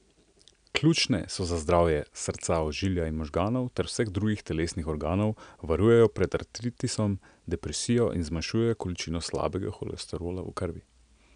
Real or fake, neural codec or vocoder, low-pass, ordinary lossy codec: real; none; 9.9 kHz; none